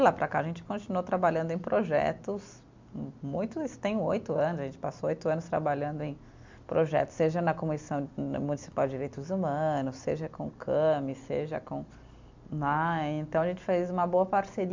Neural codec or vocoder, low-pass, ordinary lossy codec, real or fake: none; 7.2 kHz; MP3, 64 kbps; real